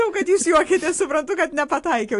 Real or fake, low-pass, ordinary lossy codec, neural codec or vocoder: real; 10.8 kHz; AAC, 48 kbps; none